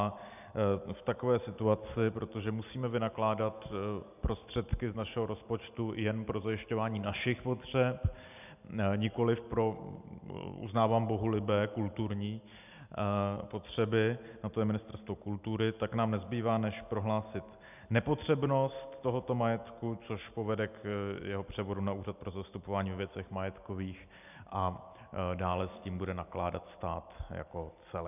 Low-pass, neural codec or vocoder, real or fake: 3.6 kHz; none; real